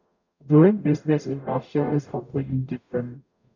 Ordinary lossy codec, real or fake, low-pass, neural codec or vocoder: none; fake; 7.2 kHz; codec, 44.1 kHz, 0.9 kbps, DAC